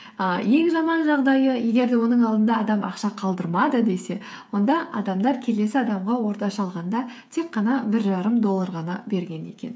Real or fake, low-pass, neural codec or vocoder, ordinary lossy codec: fake; none; codec, 16 kHz, 8 kbps, FreqCodec, smaller model; none